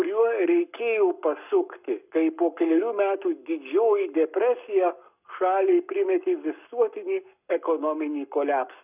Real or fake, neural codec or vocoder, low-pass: fake; codec, 44.1 kHz, 7.8 kbps, Pupu-Codec; 3.6 kHz